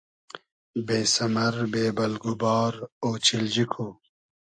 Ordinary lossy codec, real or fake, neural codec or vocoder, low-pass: AAC, 64 kbps; real; none; 9.9 kHz